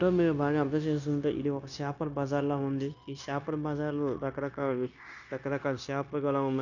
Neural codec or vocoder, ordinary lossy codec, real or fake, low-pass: codec, 16 kHz, 0.9 kbps, LongCat-Audio-Codec; none; fake; 7.2 kHz